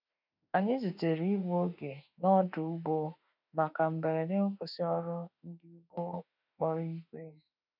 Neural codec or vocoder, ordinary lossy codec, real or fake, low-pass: autoencoder, 48 kHz, 32 numbers a frame, DAC-VAE, trained on Japanese speech; none; fake; 5.4 kHz